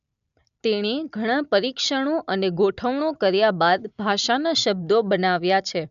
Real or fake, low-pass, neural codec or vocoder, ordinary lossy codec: real; 7.2 kHz; none; none